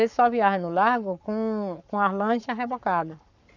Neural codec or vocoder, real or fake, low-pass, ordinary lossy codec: codec, 44.1 kHz, 7.8 kbps, Pupu-Codec; fake; 7.2 kHz; none